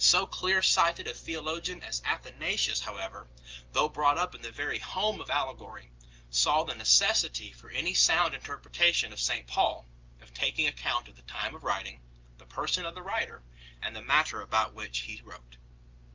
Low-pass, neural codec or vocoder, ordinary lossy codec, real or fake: 7.2 kHz; none; Opus, 24 kbps; real